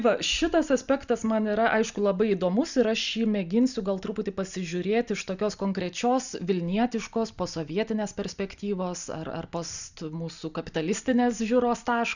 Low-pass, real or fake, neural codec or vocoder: 7.2 kHz; real; none